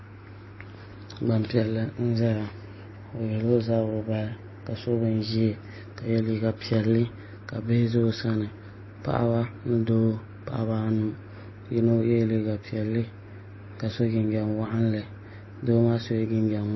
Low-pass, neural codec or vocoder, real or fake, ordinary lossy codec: 7.2 kHz; none; real; MP3, 24 kbps